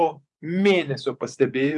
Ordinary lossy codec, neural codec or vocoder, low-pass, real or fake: MP3, 96 kbps; vocoder, 24 kHz, 100 mel bands, Vocos; 10.8 kHz; fake